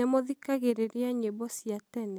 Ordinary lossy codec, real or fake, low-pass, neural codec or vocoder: none; real; none; none